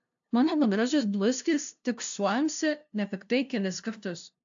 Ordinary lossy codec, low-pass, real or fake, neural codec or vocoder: AAC, 64 kbps; 7.2 kHz; fake; codec, 16 kHz, 0.5 kbps, FunCodec, trained on LibriTTS, 25 frames a second